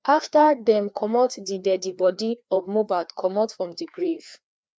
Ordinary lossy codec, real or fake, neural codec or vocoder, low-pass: none; fake; codec, 16 kHz, 2 kbps, FreqCodec, larger model; none